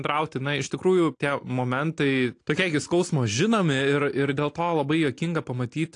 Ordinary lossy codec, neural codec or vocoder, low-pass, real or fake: AAC, 48 kbps; none; 9.9 kHz; real